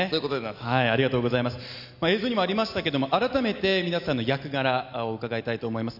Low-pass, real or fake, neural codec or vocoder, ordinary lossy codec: 5.4 kHz; real; none; none